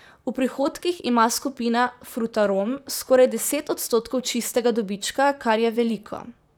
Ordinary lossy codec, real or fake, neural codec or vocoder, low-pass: none; fake; vocoder, 44.1 kHz, 128 mel bands, Pupu-Vocoder; none